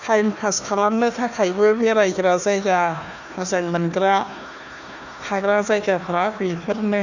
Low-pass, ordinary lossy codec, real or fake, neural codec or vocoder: 7.2 kHz; none; fake; codec, 16 kHz, 1 kbps, FunCodec, trained on Chinese and English, 50 frames a second